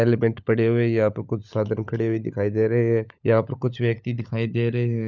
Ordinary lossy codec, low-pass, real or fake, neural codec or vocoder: none; 7.2 kHz; fake; codec, 16 kHz, 16 kbps, FunCodec, trained on LibriTTS, 50 frames a second